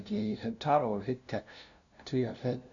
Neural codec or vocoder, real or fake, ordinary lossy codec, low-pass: codec, 16 kHz, 0.5 kbps, FunCodec, trained on LibriTTS, 25 frames a second; fake; none; 7.2 kHz